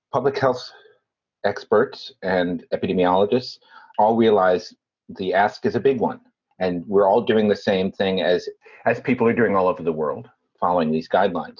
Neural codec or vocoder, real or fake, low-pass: none; real; 7.2 kHz